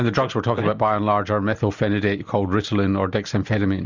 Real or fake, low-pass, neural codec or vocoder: real; 7.2 kHz; none